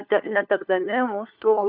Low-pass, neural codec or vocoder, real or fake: 5.4 kHz; codec, 16 kHz, 4 kbps, FunCodec, trained on LibriTTS, 50 frames a second; fake